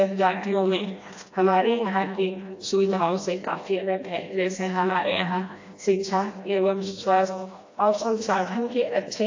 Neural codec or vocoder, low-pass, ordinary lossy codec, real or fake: codec, 16 kHz, 1 kbps, FreqCodec, smaller model; 7.2 kHz; AAC, 48 kbps; fake